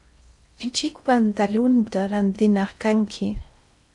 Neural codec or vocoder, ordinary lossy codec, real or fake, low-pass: codec, 16 kHz in and 24 kHz out, 0.6 kbps, FocalCodec, streaming, 4096 codes; MP3, 96 kbps; fake; 10.8 kHz